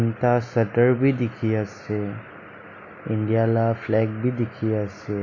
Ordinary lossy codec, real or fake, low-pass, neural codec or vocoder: AAC, 48 kbps; real; 7.2 kHz; none